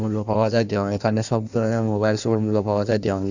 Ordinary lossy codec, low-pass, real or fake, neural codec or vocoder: none; 7.2 kHz; fake; codec, 16 kHz in and 24 kHz out, 1.1 kbps, FireRedTTS-2 codec